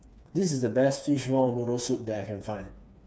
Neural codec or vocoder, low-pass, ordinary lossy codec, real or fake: codec, 16 kHz, 4 kbps, FreqCodec, smaller model; none; none; fake